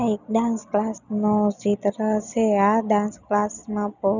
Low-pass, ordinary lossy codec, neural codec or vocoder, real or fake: 7.2 kHz; none; none; real